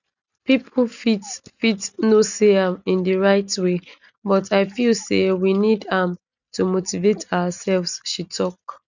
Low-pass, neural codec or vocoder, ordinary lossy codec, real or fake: 7.2 kHz; none; none; real